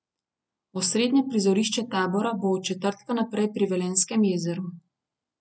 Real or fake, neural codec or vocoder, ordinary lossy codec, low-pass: real; none; none; none